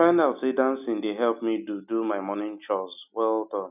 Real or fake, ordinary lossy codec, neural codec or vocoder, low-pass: real; none; none; 3.6 kHz